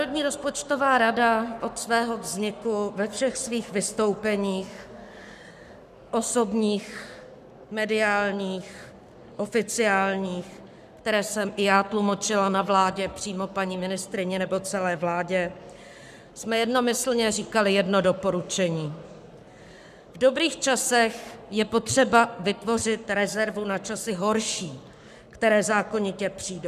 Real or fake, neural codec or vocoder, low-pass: fake; codec, 44.1 kHz, 7.8 kbps, Pupu-Codec; 14.4 kHz